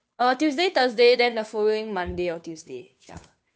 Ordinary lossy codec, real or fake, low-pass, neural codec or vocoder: none; fake; none; codec, 16 kHz, 2 kbps, FunCodec, trained on Chinese and English, 25 frames a second